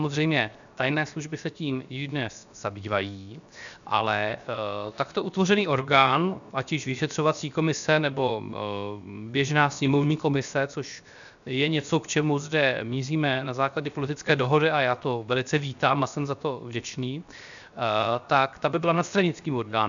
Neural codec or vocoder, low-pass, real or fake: codec, 16 kHz, 0.7 kbps, FocalCodec; 7.2 kHz; fake